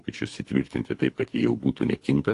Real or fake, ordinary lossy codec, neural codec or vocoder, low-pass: fake; AAC, 48 kbps; codec, 24 kHz, 3 kbps, HILCodec; 10.8 kHz